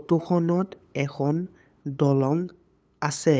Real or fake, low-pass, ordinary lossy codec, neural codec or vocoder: fake; none; none; codec, 16 kHz, 8 kbps, FunCodec, trained on LibriTTS, 25 frames a second